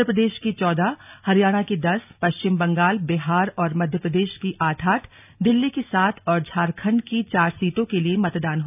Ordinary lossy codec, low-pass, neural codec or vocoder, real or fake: none; 3.6 kHz; none; real